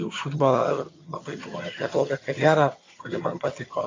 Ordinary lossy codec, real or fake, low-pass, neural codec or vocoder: AAC, 32 kbps; fake; 7.2 kHz; vocoder, 22.05 kHz, 80 mel bands, HiFi-GAN